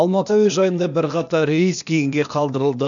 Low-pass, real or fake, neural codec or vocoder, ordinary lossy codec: 7.2 kHz; fake; codec, 16 kHz, 0.8 kbps, ZipCodec; none